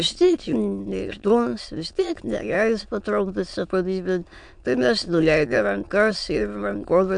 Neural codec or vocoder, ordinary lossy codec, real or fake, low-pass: autoencoder, 22.05 kHz, a latent of 192 numbers a frame, VITS, trained on many speakers; MP3, 64 kbps; fake; 9.9 kHz